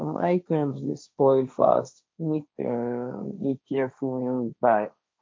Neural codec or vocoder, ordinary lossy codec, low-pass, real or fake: codec, 16 kHz, 1.1 kbps, Voila-Tokenizer; none; none; fake